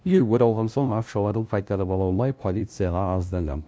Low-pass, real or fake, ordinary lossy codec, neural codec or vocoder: none; fake; none; codec, 16 kHz, 0.5 kbps, FunCodec, trained on LibriTTS, 25 frames a second